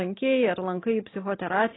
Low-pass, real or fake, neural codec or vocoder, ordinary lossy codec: 7.2 kHz; real; none; AAC, 16 kbps